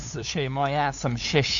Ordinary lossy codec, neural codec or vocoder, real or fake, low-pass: AAC, 64 kbps; codec, 16 kHz, 8 kbps, FunCodec, trained on LibriTTS, 25 frames a second; fake; 7.2 kHz